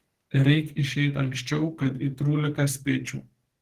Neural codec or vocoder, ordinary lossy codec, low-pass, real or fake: codec, 44.1 kHz, 2.6 kbps, SNAC; Opus, 16 kbps; 14.4 kHz; fake